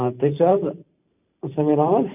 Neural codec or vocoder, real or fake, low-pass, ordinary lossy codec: vocoder, 44.1 kHz, 128 mel bands every 512 samples, BigVGAN v2; fake; 3.6 kHz; none